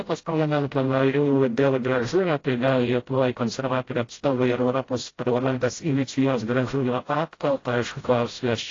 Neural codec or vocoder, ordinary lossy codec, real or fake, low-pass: codec, 16 kHz, 0.5 kbps, FreqCodec, smaller model; AAC, 32 kbps; fake; 7.2 kHz